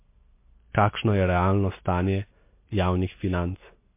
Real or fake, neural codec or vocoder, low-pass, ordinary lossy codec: real; none; 3.6 kHz; MP3, 24 kbps